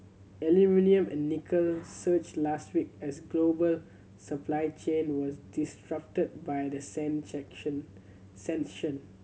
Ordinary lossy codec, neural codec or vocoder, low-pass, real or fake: none; none; none; real